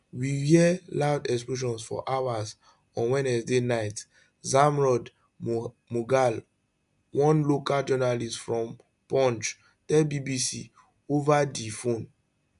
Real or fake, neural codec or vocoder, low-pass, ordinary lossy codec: real; none; 10.8 kHz; none